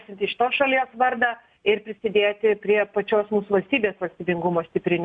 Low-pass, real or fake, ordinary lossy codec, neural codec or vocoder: 9.9 kHz; real; MP3, 64 kbps; none